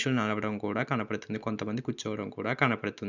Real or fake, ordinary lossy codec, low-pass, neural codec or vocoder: real; none; 7.2 kHz; none